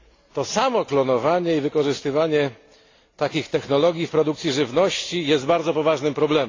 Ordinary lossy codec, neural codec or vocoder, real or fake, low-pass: AAC, 32 kbps; none; real; 7.2 kHz